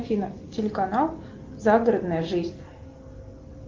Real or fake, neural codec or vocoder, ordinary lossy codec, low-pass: real; none; Opus, 32 kbps; 7.2 kHz